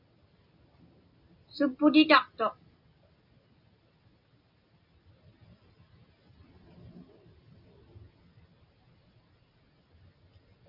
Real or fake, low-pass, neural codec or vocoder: real; 5.4 kHz; none